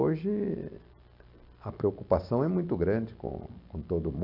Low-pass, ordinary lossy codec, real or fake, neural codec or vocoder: 5.4 kHz; none; real; none